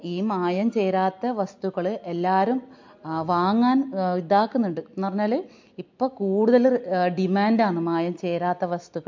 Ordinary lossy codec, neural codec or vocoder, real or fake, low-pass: MP3, 48 kbps; none; real; 7.2 kHz